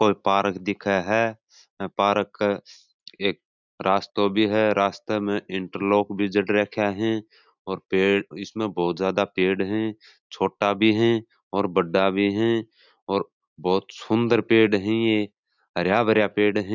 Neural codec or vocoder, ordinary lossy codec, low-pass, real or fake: none; none; 7.2 kHz; real